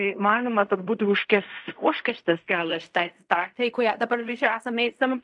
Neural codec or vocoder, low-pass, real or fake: codec, 16 kHz in and 24 kHz out, 0.4 kbps, LongCat-Audio-Codec, fine tuned four codebook decoder; 10.8 kHz; fake